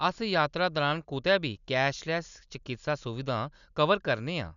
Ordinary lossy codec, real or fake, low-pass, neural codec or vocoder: none; real; 7.2 kHz; none